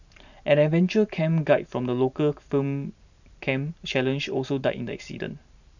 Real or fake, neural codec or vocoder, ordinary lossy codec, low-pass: fake; vocoder, 44.1 kHz, 128 mel bands every 512 samples, BigVGAN v2; none; 7.2 kHz